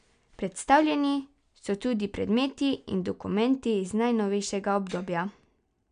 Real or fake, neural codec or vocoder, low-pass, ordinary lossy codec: real; none; 9.9 kHz; none